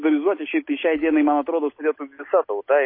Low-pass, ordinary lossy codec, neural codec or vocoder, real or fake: 5.4 kHz; MP3, 24 kbps; none; real